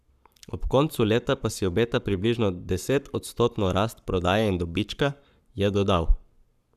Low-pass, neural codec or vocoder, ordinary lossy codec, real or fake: 14.4 kHz; codec, 44.1 kHz, 7.8 kbps, Pupu-Codec; none; fake